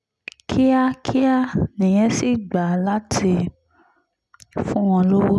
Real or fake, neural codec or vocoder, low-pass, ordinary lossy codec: real; none; none; none